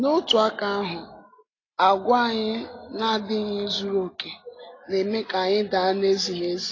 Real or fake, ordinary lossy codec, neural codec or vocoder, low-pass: real; AAC, 32 kbps; none; 7.2 kHz